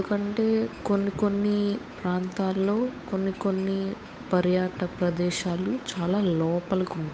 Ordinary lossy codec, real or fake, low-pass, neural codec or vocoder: none; fake; none; codec, 16 kHz, 8 kbps, FunCodec, trained on Chinese and English, 25 frames a second